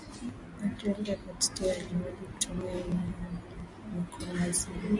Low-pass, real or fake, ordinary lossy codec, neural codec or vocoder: 10.8 kHz; real; Opus, 64 kbps; none